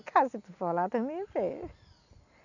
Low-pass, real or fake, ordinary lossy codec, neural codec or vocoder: 7.2 kHz; real; none; none